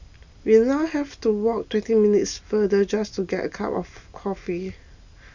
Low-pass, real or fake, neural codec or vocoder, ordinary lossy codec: 7.2 kHz; real; none; none